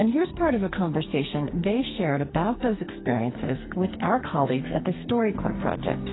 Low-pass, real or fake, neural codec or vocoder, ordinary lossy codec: 7.2 kHz; fake; codec, 44.1 kHz, 2.6 kbps, SNAC; AAC, 16 kbps